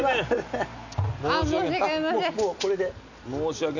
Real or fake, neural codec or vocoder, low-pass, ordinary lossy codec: real; none; 7.2 kHz; none